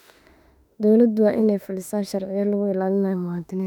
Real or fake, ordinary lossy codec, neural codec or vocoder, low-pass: fake; none; autoencoder, 48 kHz, 32 numbers a frame, DAC-VAE, trained on Japanese speech; 19.8 kHz